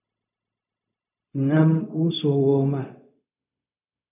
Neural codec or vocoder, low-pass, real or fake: codec, 16 kHz, 0.4 kbps, LongCat-Audio-Codec; 3.6 kHz; fake